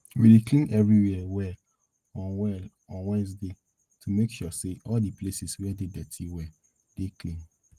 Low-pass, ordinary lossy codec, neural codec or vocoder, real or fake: 14.4 kHz; Opus, 24 kbps; none; real